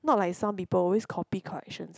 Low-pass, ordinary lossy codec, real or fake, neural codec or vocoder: none; none; real; none